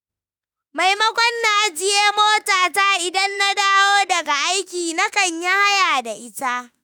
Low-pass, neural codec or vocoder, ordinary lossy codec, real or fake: none; autoencoder, 48 kHz, 32 numbers a frame, DAC-VAE, trained on Japanese speech; none; fake